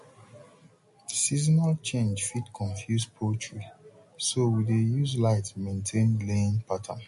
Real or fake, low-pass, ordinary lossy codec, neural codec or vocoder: real; 14.4 kHz; MP3, 48 kbps; none